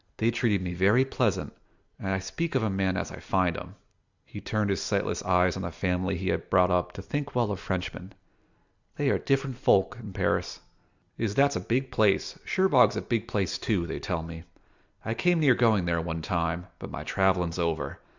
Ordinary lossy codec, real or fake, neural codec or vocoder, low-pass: Opus, 64 kbps; real; none; 7.2 kHz